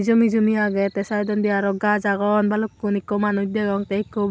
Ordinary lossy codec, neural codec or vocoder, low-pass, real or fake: none; none; none; real